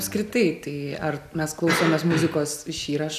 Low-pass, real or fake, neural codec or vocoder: 14.4 kHz; real; none